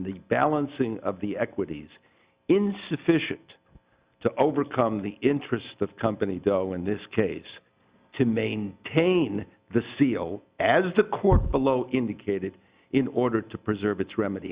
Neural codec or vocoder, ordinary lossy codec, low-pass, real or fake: none; Opus, 64 kbps; 3.6 kHz; real